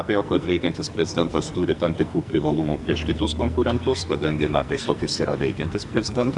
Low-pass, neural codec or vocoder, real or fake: 10.8 kHz; codec, 44.1 kHz, 2.6 kbps, SNAC; fake